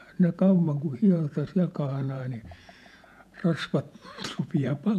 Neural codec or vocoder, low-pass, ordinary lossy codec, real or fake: vocoder, 48 kHz, 128 mel bands, Vocos; 14.4 kHz; none; fake